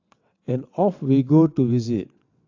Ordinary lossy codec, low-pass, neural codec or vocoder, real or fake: AAC, 48 kbps; 7.2 kHz; vocoder, 22.05 kHz, 80 mel bands, WaveNeXt; fake